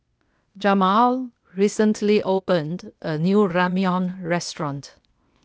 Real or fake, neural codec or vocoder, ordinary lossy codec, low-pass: fake; codec, 16 kHz, 0.8 kbps, ZipCodec; none; none